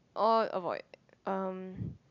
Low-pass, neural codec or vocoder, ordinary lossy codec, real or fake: 7.2 kHz; none; none; real